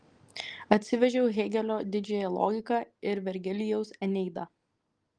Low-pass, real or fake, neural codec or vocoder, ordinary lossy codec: 9.9 kHz; real; none; Opus, 24 kbps